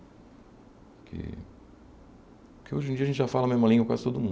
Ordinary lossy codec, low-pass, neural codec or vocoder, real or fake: none; none; none; real